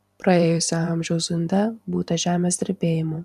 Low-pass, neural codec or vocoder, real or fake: 14.4 kHz; vocoder, 44.1 kHz, 128 mel bands every 512 samples, BigVGAN v2; fake